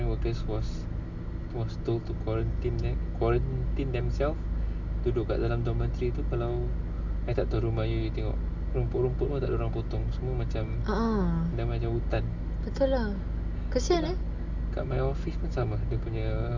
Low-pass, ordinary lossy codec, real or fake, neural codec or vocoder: 7.2 kHz; MP3, 64 kbps; real; none